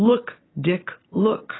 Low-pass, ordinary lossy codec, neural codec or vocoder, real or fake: 7.2 kHz; AAC, 16 kbps; none; real